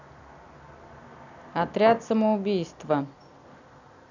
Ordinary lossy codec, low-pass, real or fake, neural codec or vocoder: none; 7.2 kHz; real; none